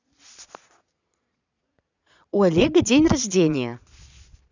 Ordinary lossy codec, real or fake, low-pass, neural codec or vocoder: none; fake; 7.2 kHz; vocoder, 22.05 kHz, 80 mel bands, Vocos